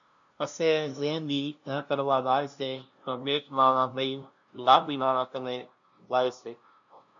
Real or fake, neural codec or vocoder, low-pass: fake; codec, 16 kHz, 0.5 kbps, FunCodec, trained on LibriTTS, 25 frames a second; 7.2 kHz